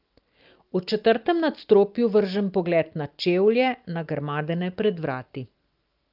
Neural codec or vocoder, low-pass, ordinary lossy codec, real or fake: none; 5.4 kHz; Opus, 32 kbps; real